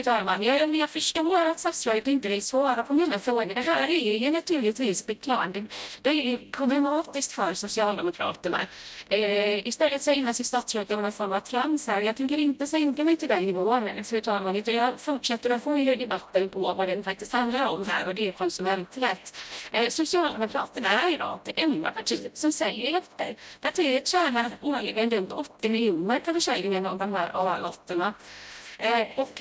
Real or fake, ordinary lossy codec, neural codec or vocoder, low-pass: fake; none; codec, 16 kHz, 0.5 kbps, FreqCodec, smaller model; none